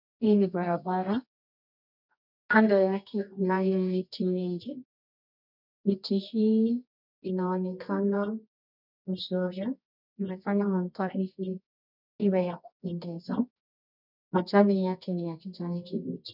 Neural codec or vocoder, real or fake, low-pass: codec, 24 kHz, 0.9 kbps, WavTokenizer, medium music audio release; fake; 5.4 kHz